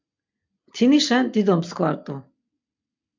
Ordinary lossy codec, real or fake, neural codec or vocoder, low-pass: MP3, 64 kbps; real; none; 7.2 kHz